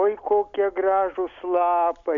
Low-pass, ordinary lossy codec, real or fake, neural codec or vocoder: 7.2 kHz; Opus, 64 kbps; real; none